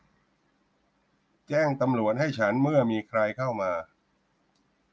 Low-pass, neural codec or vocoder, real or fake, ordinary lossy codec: none; none; real; none